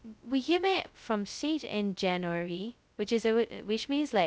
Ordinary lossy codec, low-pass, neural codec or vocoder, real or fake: none; none; codec, 16 kHz, 0.2 kbps, FocalCodec; fake